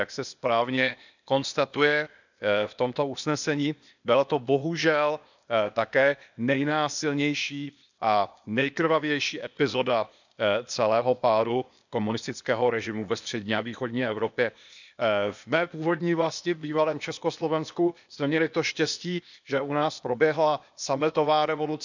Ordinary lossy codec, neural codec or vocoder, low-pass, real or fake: none; codec, 16 kHz, 0.8 kbps, ZipCodec; 7.2 kHz; fake